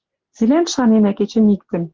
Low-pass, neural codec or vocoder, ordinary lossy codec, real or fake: 7.2 kHz; none; Opus, 16 kbps; real